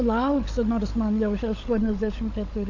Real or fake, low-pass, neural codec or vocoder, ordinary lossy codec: fake; 7.2 kHz; codec, 16 kHz, 16 kbps, FunCodec, trained on LibriTTS, 50 frames a second; AAC, 48 kbps